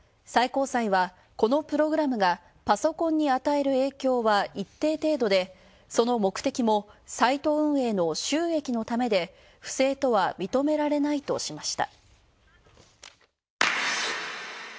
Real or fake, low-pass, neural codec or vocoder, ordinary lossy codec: real; none; none; none